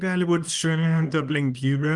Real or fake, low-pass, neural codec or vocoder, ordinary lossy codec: fake; 10.8 kHz; codec, 24 kHz, 0.9 kbps, WavTokenizer, small release; Opus, 32 kbps